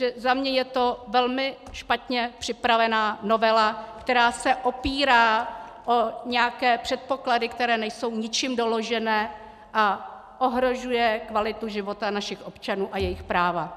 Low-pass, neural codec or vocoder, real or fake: 14.4 kHz; none; real